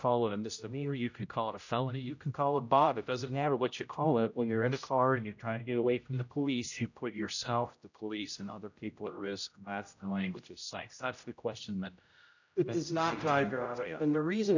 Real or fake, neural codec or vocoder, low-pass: fake; codec, 16 kHz, 0.5 kbps, X-Codec, HuBERT features, trained on general audio; 7.2 kHz